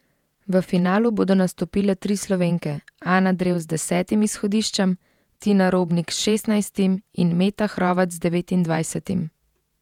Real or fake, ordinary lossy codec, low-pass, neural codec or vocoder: fake; none; 19.8 kHz; vocoder, 48 kHz, 128 mel bands, Vocos